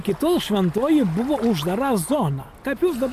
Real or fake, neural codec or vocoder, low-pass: real; none; 14.4 kHz